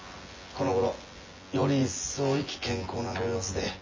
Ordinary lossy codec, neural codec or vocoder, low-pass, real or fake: MP3, 32 kbps; vocoder, 24 kHz, 100 mel bands, Vocos; 7.2 kHz; fake